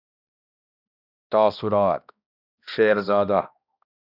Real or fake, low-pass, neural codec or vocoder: fake; 5.4 kHz; codec, 16 kHz, 1 kbps, X-Codec, HuBERT features, trained on balanced general audio